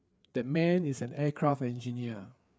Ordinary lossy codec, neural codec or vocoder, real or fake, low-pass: none; codec, 16 kHz, 4 kbps, FreqCodec, larger model; fake; none